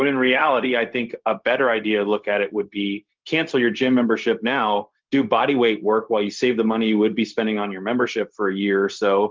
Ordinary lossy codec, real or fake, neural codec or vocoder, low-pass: Opus, 24 kbps; fake; codec, 16 kHz, 0.4 kbps, LongCat-Audio-Codec; 7.2 kHz